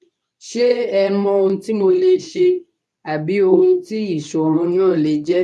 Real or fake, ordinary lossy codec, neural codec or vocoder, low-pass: fake; none; codec, 24 kHz, 0.9 kbps, WavTokenizer, medium speech release version 2; none